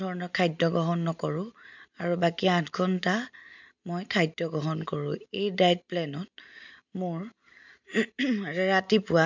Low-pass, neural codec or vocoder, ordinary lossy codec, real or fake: 7.2 kHz; none; MP3, 64 kbps; real